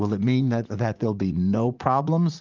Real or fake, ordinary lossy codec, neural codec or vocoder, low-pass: fake; Opus, 24 kbps; vocoder, 44.1 kHz, 80 mel bands, Vocos; 7.2 kHz